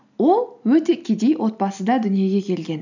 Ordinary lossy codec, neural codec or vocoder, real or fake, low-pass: none; none; real; 7.2 kHz